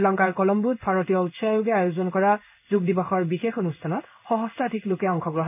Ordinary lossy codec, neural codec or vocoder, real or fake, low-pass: none; codec, 16 kHz in and 24 kHz out, 1 kbps, XY-Tokenizer; fake; 3.6 kHz